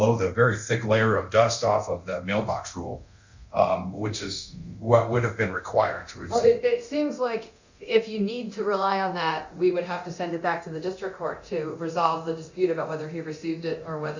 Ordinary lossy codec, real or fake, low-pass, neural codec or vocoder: Opus, 64 kbps; fake; 7.2 kHz; codec, 24 kHz, 0.9 kbps, DualCodec